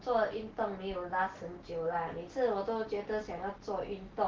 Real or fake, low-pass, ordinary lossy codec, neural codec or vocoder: real; 7.2 kHz; Opus, 16 kbps; none